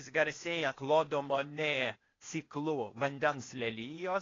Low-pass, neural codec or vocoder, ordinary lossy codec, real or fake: 7.2 kHz; codec, 16 kHz, 0.8 kbps, ZipCodec; AAC, 32 kbps; fake